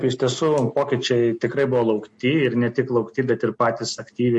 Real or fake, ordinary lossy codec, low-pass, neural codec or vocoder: real; MP3, 48 kbps; 10.8 kHz; none